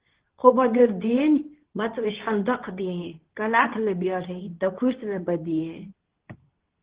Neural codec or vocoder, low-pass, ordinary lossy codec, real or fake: codec, 24 kHz, 0.9 kbps, WavTokenizer, medium speech release version 2; 3.6 kHz; Opus, 16 kbps; fake